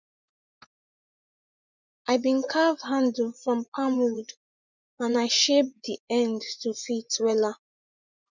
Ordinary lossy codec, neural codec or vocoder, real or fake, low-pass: none; vocoder, 24 kHz, 100 mel bands, Vocos; fake; 7.2 kHz